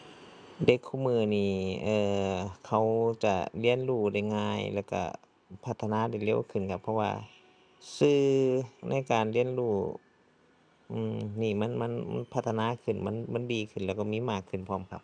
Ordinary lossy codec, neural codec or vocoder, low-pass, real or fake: none; none; 9.9 kHz; real